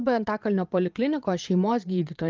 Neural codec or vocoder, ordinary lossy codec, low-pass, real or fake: vocoder, 22.05 kHz, 80 mel bands, Vocos; Opus, 24 kbps; 7.2 kHz; fake